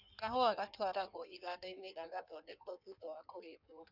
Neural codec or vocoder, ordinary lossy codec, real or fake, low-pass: codec, 16 kHz in and 24 kHz out, 1.1 kbps, FireRedTTS-2 codec; none; fake; 5.4 kHz